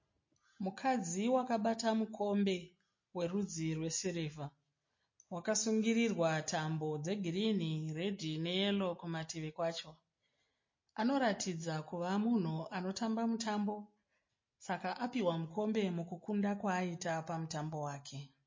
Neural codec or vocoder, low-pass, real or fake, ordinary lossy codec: none; 7.2 kHz; real; MP3, 32 kbps